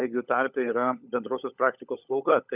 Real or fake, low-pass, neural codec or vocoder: fake; 3.6 kHz; codec, 16 kHz, 16 kbps, FunCodec, trained on LibriTTS, 50 frames a second